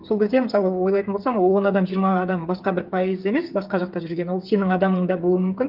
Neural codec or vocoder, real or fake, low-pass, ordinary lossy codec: codec, 16 kHz, 8 kbps, FreqCodec, smaller model; fake; 5.4 kHz; Opus, 24 kbps